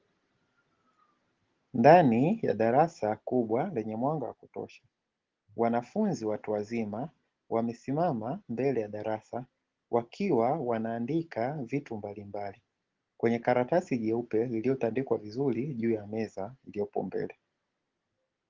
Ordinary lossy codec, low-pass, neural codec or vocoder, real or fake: Opus, 16 kbps; 7.2 kHz; none; real